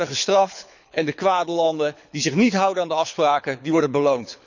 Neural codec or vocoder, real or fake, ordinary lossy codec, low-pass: codec, 24 kHz, 6 kbps, HILCodec; fake; none; 7.2 kHz